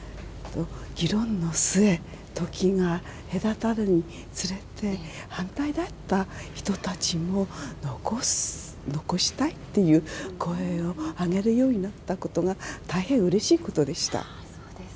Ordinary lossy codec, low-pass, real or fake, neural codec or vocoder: none; none; real; none